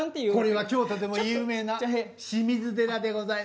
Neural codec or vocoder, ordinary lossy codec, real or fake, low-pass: none; none; real; none